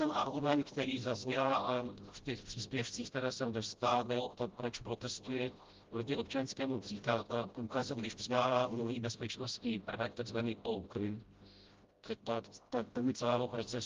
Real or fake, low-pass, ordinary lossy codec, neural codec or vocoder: fake; 7.2 kHz; Opus, 32 kbps; codec, 16 kHz, 0.5 kbps, FreqCodec, smaller model